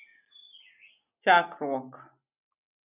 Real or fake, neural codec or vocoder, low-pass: real; none; 3.6 kHz